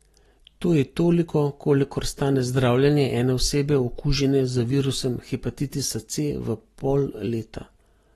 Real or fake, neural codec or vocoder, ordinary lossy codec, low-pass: real; none; AAC, 32 kbps; 19.8 kHz